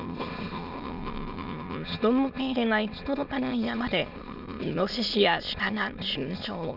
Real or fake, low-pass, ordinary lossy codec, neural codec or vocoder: fake; 5.4 kHz; none; autoencoder, 22.05 kHz, a latent of 192 numbers a frame, VITS, trained on many speakers